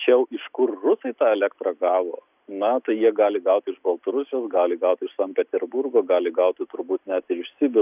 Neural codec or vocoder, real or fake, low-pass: none; real; 3.6 kHz